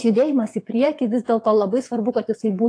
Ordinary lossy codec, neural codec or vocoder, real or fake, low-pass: AAC, 48 kbps; vocoder, 22.05 kHz, 80 mel bands, WaveNeXt; fake; 9.9 kHz